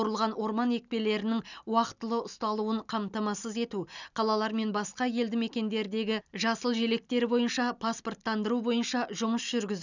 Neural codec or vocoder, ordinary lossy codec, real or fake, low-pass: none; none; real; 7.2 kHz